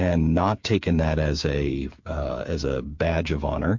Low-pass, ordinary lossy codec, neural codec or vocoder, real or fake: 7.2 kHz; MP3, 48 kbps; codec, 16 kHz, 8 kbps, FreqCodec, smaller model; fake